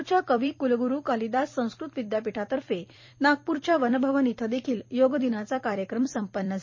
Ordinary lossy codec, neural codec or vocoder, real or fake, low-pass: MP3, 32 kbps; vocoder, 44.1 kHz, 128 mel bands every 256 samples, BigVGAN v2; fake; 7.2 kHz